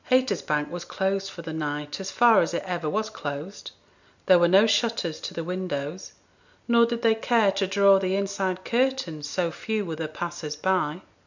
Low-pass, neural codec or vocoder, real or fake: 7.2 kHz; none; real